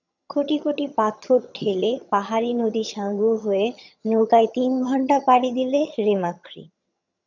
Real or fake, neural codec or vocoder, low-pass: fake; vocoder, 22.05 kHz, 80 mel bands, HiFi-GAN; 7.2 kHz